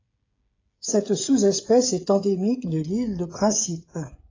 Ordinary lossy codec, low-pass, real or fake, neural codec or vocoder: AAC, 32 kbps; 7.2 kHz; fake; codec, 16 kHz, 16 kbps, FreqCodec, smaller model